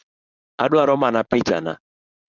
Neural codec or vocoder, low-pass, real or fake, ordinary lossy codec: codec, 16 kHz, 4.8 kbps, FACodec; 7.2 kHz; fake; Opus, 64 kbps